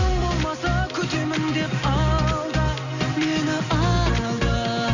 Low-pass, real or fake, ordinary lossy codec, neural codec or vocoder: 7.2 kHz; real; none; none